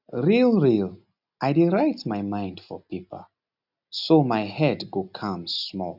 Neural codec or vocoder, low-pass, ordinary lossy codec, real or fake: none; 5.4 kHz; none; real